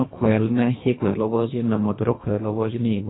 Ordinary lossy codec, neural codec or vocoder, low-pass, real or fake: AAC, 16 kbps; codec, 24 kHz, 1.5 kbps, HILCodec; 7.2 kHz; fake